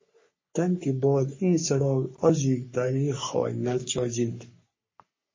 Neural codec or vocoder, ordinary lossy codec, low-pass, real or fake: codec, 44.1 kHz, 3.4 kbps, Pupu-Codec; MP3, 32 kbps; 7.2 kHz; fake